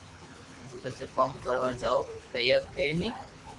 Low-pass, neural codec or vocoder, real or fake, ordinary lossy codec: 10.8 kHz; codec, 24 kHz, 3 kbps, HILCodec; fake; MP3, 96 kbps